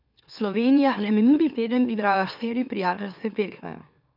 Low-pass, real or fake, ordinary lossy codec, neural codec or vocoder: 5.4 kHz; fake; none; autoencoder, 44.1 kHz, a latent of 192 numbers a frame, MeloTTS